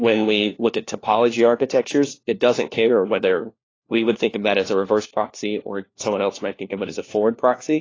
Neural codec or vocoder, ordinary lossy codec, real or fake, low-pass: codec, 16 kHz, 1 kbps, FunCodec, trained on LibriTTS, 50 frames a second; AAC, 32 kbps; fake; 7.2 kHz